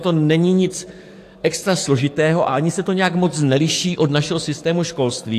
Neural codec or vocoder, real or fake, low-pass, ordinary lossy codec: codec, 44.1 kHz, 7.8 kbps, DAC; fake; 14.4 kHz; AAC, 64 kbps